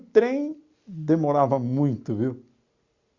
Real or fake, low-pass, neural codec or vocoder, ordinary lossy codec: real; 7.2 kHz; none; Opus, 64 kbps